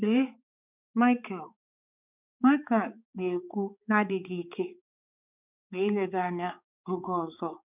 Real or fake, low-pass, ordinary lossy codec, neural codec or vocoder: fake; 3.6 kHz; none; codec, 16 kHz, 4 kbps, X-Codec, HuBERT features, trained on balanced general audio